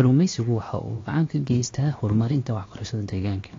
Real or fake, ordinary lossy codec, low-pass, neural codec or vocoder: fake; AAC, 32 kbps; 7.2 kHz; codec, 16 kHz, about 1 kbps, DyCAST, with the encoder's durations